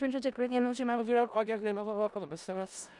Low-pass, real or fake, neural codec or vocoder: 10.8 kHz; fake; codec, 16 kHz in and 24 kHz out, 0.4 kbps, LongCat-Audio-Codec, four codebook decoder